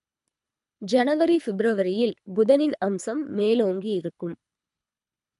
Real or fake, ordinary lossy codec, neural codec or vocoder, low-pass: fake; none; codec, 24 kHz, 3 kbps, HILCodec; 10.8 kHz